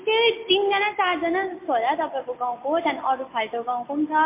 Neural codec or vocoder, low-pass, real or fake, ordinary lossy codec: none; 3.6 kHz; real; MP3, 24 kbps